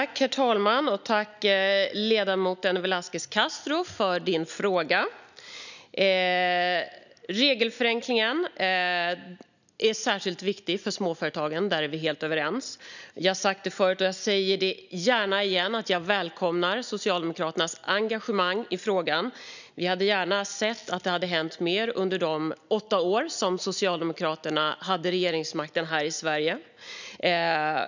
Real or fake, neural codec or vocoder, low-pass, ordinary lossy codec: real; none; 7.2 kHz; none